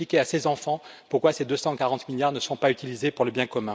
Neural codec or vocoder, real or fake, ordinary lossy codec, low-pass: none; real; none; none